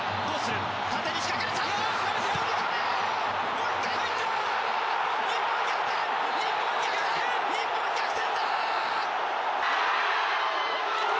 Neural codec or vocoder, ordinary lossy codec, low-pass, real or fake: none; none; none; real